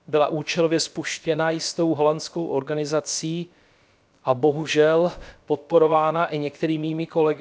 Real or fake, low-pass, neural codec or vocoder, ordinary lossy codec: fake; none; codec, 16 kHz, about 1 kbps, DyCAST, with the encoder's durations; none